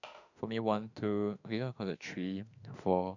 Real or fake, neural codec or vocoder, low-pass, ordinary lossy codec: fake; autoencoder, 48 kHz, 32 numbers a frame, DAC-VAE, trained on Japanese speech; 7.2 kHz; none